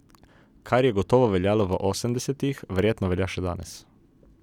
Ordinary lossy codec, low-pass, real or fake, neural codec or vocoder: none; 19.8 kHz; real; none